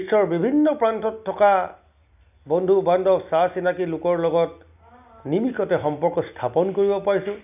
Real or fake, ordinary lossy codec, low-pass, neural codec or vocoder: real; none; 3.6 kHz; none